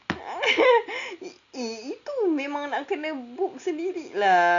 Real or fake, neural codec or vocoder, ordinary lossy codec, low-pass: real; none; none; 7.2 kHz